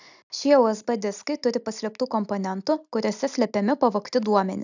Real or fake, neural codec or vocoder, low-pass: real; none; 7.2 kHz